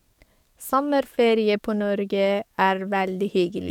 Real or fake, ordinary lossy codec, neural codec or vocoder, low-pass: fake; none; codec, 44.1 kHz, 7.8 kbps, DAC; 19.8 kHz